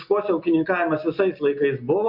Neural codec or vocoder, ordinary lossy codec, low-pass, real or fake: none; MP3, 48 kbps; 5.4 kHz; real